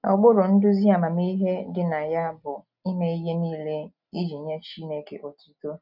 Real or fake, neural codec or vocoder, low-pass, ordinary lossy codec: real; none; 5.4 kHz; none